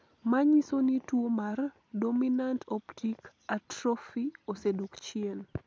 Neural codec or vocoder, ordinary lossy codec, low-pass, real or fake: none; none; none; real